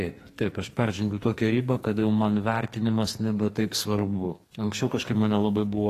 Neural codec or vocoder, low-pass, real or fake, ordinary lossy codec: codec, 44.1 kHz, 2.6 kbps, SNAC; 14.4 kHz; fake; AAC, 48 kbps